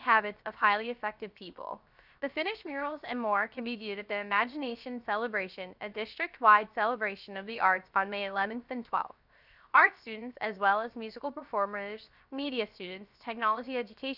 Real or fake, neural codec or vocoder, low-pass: fake; codec, 16 kHz, 0.7 kbps, FocalCodec; 5.4 kHz